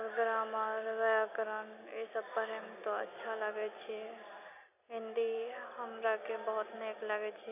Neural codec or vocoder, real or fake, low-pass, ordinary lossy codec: none; real; 3.6 kHz; none